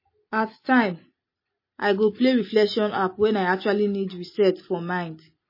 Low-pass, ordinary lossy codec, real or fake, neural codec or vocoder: 5.4 kHz; MP3, 24 kbps; real; none